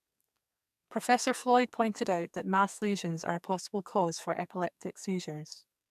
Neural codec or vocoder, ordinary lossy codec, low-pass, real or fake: codec, 44.1 kHz, 2.6 kbps, SNAC; none; 14.4 kHz; fake